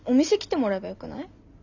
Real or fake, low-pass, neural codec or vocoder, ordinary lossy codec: real; 7.2 kHz; none; none